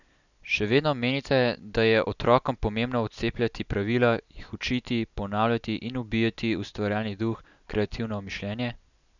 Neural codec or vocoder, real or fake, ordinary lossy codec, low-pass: none; real; none; 7.2 kHz